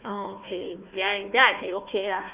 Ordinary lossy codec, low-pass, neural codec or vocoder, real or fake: Opus, 64 kbps; 3.6 kHz; codec, 16 kHz, 1 kbps, FunCodec, trained on Chinese and English, 50 frames a second; fake